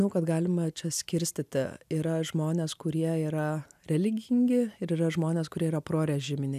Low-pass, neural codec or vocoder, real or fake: 14.4 kHz; none; real